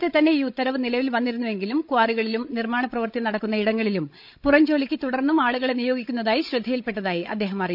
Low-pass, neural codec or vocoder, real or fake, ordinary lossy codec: 5.4 kHz; codec, 16 kHz, 16 kbps, FreqCodec, larger model; fake; none